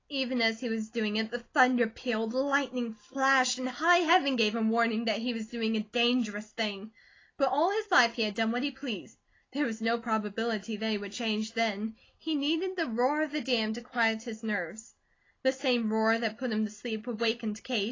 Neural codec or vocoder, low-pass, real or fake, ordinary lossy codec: none; 7.2 kHz; real; AAC, 32 kbps